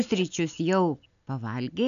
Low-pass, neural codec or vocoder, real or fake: 7.2 kHz; none; real